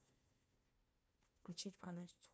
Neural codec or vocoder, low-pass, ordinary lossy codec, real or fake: codec, 16 kHz, 1 kbps, FunCodec, trained on Chinese and English, 50 frames a second; none; none; fake